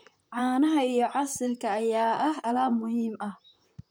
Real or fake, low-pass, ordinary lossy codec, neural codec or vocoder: fake; none; none; vocoder, 44.1 kHz, 128 mel bands, Pupu-Vocoder